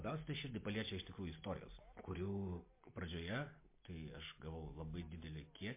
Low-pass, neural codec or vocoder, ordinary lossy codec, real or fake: 3.6 kHz; none; MP3, 24 kbps; real